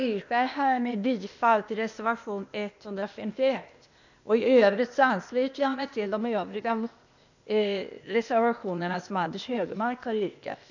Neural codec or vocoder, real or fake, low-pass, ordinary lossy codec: codec, 16 kHz, 0.8 kbps, ZipCodec; fake; 7.2 kHz; none